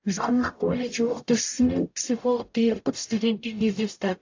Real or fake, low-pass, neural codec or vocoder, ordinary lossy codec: fake; 7.2 kHz; codec, 44.1 kHz, 0.9 kbps, DAC; AAC, 48 kbps